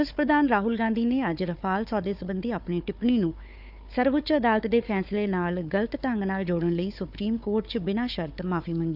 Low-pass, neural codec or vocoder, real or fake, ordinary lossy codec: 5.4 kHz; codec, 16 kHz, 4 kbps, FunCodec, trained on Chinese and English, 50 frames a second; fake; none